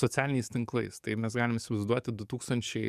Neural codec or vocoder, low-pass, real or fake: codec, 44.1 kHz, 7.8 kbps, DAC; 14.4 kHz; fake